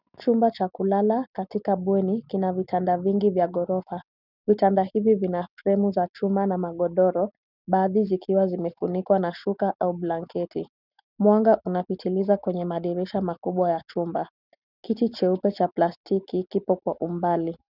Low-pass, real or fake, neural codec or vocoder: 5.4 kHz; real; none